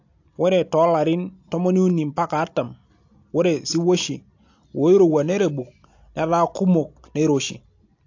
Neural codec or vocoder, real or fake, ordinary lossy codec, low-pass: none; real; AAC, 48 kbps; 7.2 kHz